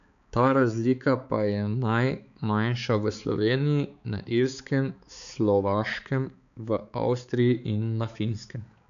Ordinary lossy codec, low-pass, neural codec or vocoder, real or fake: none; 7.2 kHz; codec, 16 kHz, 4 kbps, X-Codec, HuBERT features, trained on balanced general audio; fake